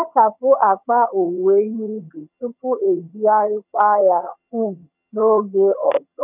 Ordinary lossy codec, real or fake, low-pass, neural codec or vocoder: none; fake; 3.6 kHz; vocoder, 22.05 kHz, 80 mel bands, HiFi-GAN